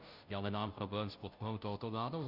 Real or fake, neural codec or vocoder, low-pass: fake; codec, 16 kHz, 0.5 kbps, FunCodec, trained on Chinese and English, 25 frames a second; 5.4 kHz